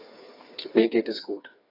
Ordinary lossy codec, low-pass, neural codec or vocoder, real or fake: AAC, 32 kbps; 5.4 kHz; codec, 16 kHz, 4 kbps, FreqCodec, smaller model; fake